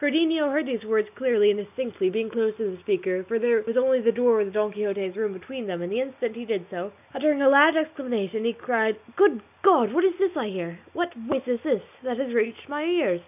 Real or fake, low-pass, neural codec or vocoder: real; 3.6 kHz; none